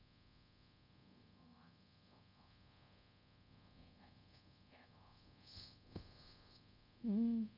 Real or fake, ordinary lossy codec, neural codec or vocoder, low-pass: fake; MP3, 48 kbps; codec, 24 kHz, 0.5 kbps, DualCodec; 5.4 kHz